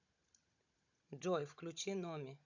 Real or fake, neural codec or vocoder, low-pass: fake; codec, 16 kHz, 16 kbps, FunCodec, trained on Chinese and English, 50 frames a second; 7.2 kHz